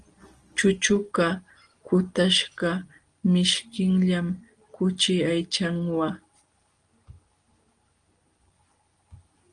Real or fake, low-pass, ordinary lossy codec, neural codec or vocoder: real; 9.9 kHz; Opus, 24 kbps; none